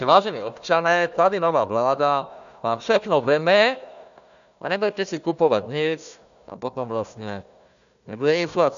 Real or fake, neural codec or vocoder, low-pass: fake; codec, 16 kHz, 1 kbps, FunCodec, trained on Chinese and English, 50 frames a second; 7.2 kHz